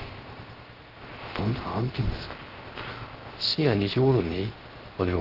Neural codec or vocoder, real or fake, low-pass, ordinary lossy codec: codec, 16 kHz, 0.3 kbps, FocalCodec; fake; 5.4 kHz; Opus, 16 kbps